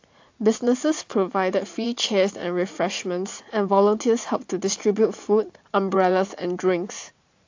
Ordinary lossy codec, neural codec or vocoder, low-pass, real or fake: AAC, 48 kbps; vocoder, 44.1 kHz, 80 mel bands, Vocos; 7.2 kHz; fake